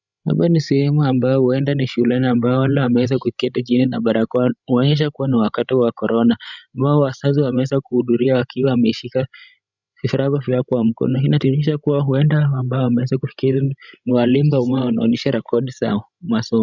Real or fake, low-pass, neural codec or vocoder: fake; 7.2 kHz; codec, 16 kHz, 16 kbps, FreqCodec, larger model